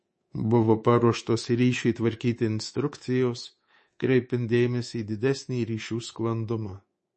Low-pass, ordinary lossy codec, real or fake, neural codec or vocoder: 10.8 kHz; MP3, 32 kbps; fake; codec, 24 kHz, 3.1 kbps, DualCodec